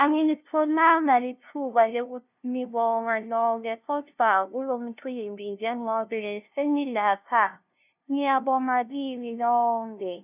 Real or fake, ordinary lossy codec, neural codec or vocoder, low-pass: fake; none; codec, 16 kHz, 0.5 kbps, FunCodec, trained on LibriTTS, 25 frames a second; 3.6 kHz